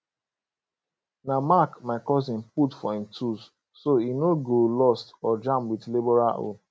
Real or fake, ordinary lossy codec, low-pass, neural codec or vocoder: real; none; none; none